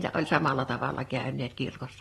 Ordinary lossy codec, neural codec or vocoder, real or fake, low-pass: AAC, 32 kbps; none; real; 19.8 kHz